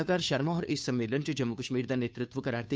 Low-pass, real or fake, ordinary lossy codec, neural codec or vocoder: none; fake; none; codec, 16 kHz, 2 kbps, FunCodec, trained on Chinese and English, 25 frames a second